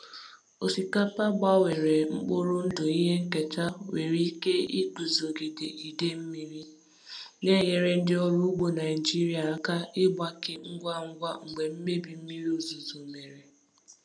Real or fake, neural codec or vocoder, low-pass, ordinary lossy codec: real; none; 9.9 kHz; none